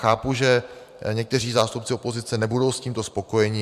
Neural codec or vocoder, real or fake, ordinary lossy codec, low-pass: vocoder, 44.1 kHz, 128 mel bands every 512 samples, BigVGAN v2; fake; MP3, 96 kbps; 14.4 kHz